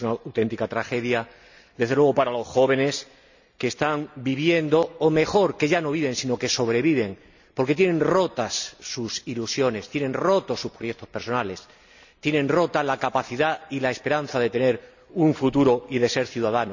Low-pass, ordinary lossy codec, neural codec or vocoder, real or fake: 7.2 kHz; none; none; real